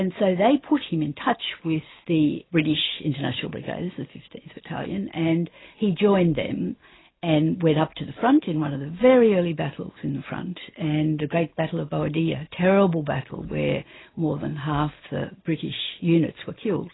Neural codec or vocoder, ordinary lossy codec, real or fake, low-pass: none; AAC, 16 kbps; real; 7.2 kHz